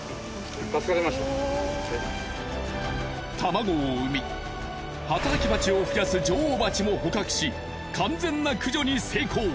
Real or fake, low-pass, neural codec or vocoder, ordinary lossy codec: real; none; none; none